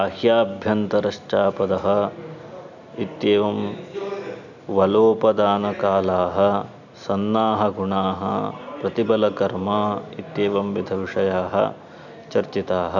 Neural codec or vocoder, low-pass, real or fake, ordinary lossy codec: none; 7.2 kHz; real; none